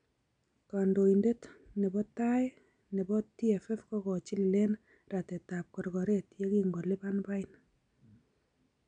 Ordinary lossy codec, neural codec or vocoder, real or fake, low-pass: none; none; real; 9.9 kHz